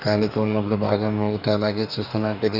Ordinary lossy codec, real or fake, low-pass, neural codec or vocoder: none; fake; 5.4 kHz; codec, 44.1 kHz, 2.6 kbps, DAC